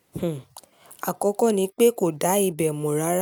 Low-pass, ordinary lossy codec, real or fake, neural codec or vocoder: none; none; real; none